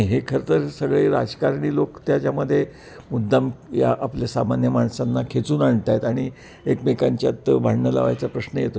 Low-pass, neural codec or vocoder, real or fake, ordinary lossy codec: none; none; real; none